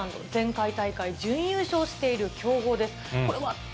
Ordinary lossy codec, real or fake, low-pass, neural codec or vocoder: none; real; none; none